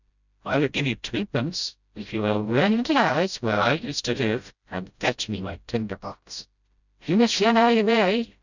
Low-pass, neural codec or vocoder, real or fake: 7.2 kHz; codec, 16 kHz, 0.5 kbps, FreqCodec, smaller model; fake